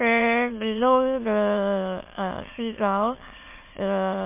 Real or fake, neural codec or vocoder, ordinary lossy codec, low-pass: fake; autoencoder, 22.05 kHz, a latent of 192 numbers a frame, VITS, trained on many speakers; MP3, 24 kbps; 3.6 kHz